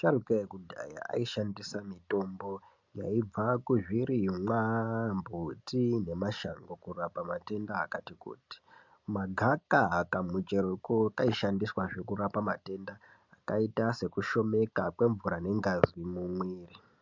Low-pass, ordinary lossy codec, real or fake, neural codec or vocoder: 7.2 kHz; MP3, 64 kbps; real; none